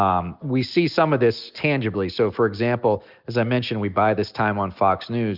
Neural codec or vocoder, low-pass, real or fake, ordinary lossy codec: none; 5.4 kHz; real; Opus, 64 kbps